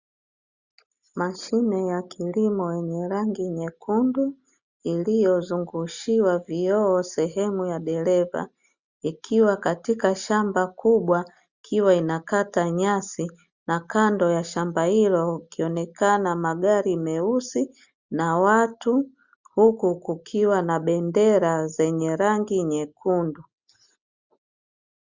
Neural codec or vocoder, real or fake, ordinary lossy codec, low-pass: none; real; Opus, 64 kbps; 7.2 kHz